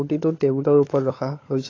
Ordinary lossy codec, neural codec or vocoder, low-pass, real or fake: AAC, 32 kbps; codec, 16 kHz, 16 kbps, FunCodec, trained on LibriTTS, 50 frames a second; 7.2 kHz; fake